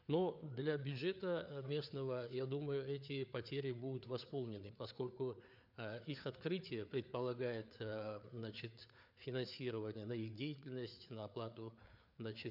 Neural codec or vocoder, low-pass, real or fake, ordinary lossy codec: codec, 16 kHz, 4 kbps, FreqCodec, larger model; 5.4 kHz; fake; none